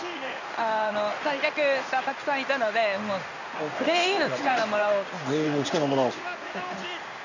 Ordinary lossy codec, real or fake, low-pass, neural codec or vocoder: none; fake; 7.2 kHz; codec, 16 kHz in and 24 kHz out, 1 kbps, XY-Tokenizer